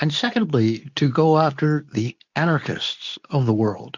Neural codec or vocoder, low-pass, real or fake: codec, 24 kHz, 0.9 kbps, WavTokenizer, medium speech release version 2; 7.2 kHz; fake